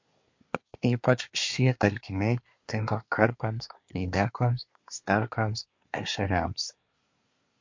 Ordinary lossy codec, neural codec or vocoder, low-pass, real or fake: MP3, 48 kbps; codec, 24 kHz, 1 kbps, SNAC; 7.2 kHz; fake